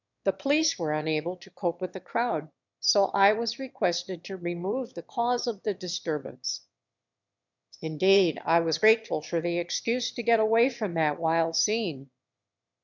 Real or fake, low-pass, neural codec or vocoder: fake; 7.2 kHz; autoencoder, 22.05 kHz, a latent of 192 numbers a frame, VITS, trained on one speaker